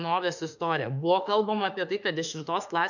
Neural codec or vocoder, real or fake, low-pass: autoencoder, 48 kHz, 32 numbers a frame, DAC-VAE, trained on Japanese speech; fake; 7.2 kHz